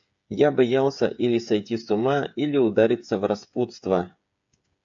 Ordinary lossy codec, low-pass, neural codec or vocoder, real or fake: AAC, 64 kbps; 7.2 kHz; codec, 16 kHz, 8 kbps, FreqCodec, smaller model; fake